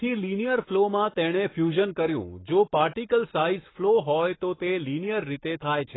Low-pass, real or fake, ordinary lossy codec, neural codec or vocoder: 7.2 kHz; fake; AAC, 16 kbps; codec, 44.1 kHz, 7.8 kbps, Pupu-Codec